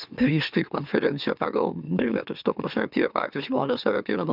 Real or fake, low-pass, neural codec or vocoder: fake; 5.4 kHz; autoencoder, 44.1 kHz, a latent of 192 numbers a frame, MeloTTS